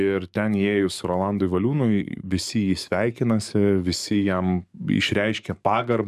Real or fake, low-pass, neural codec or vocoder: fake; 14.4 kHz; codec, 44.1 kHz, 7.8 kbps, DAC